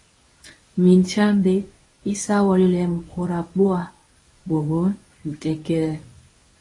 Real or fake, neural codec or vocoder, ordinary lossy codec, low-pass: fake; codec, 24 kHz, 0.9 kbps, WavTokenizer, medium speech release version 1; AAC, 32 kbps; 10.8 kHz